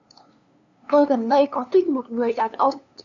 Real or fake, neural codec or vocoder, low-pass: fake; codec, 16 kHz, 2 kbps, FunCodec, trained on LibriTTS, 25 frames a second; 7.2 kHz